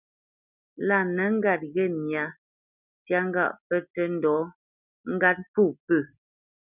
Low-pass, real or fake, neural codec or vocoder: 3.6 kHz; real; none